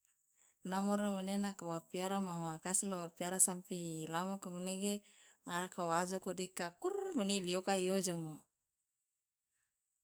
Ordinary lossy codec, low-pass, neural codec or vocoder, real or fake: none; none; codec, 44.1 kHz, 2.6 kbps, SNAC; fake